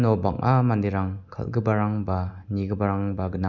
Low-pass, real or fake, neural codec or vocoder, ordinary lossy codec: 7.2 kHz; real; none; none